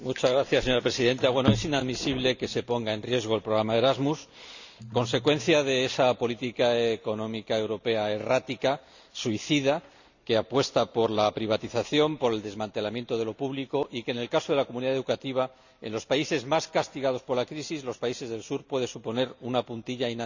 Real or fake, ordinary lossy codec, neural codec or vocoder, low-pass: real; none; none; 7.2 kHz